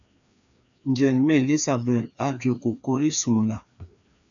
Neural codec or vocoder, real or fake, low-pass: codec, 16 kHz, 2 kbps, FreqCodec, larger model; fake; 7.2 kHz